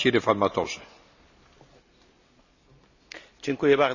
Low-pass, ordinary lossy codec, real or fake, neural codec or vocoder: 7.2 kHz; none; real; none